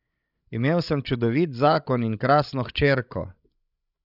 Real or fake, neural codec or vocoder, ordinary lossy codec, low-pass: fake; codec, 16 kHz, 16 kbps, FreqCodec, larger model; none; 5.4 kHz